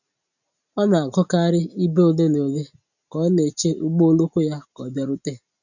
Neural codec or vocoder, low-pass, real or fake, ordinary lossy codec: none; 7.2 kHz; real; none